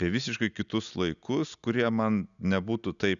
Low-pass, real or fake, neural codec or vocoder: 7.2 kHz; real; none